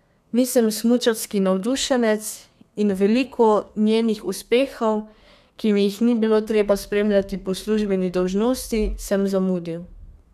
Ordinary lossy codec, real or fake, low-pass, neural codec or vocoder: none; fake; 14.4 kHz; codec, 32 kHz, 1.9 kbps, SNAC